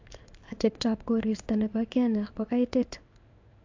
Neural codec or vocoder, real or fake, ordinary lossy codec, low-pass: codec, 16 kHz in and 24 kHz out, 1 kbps, XY-Tokenizer; fake; AAC, 48 kbps; 7.2 kHz